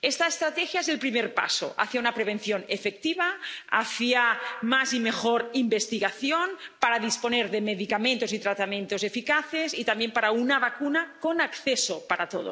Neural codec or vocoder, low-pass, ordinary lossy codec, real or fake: none; none; none; real